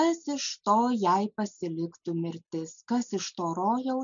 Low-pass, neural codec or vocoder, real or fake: 7.2 kHz; none; real